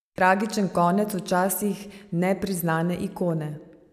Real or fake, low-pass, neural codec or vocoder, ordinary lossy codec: real; 14.4 kHz; none; none